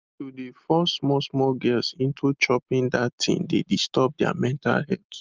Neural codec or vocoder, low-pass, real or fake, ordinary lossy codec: none; 7.2 kHz; real; Opus, 32 kbps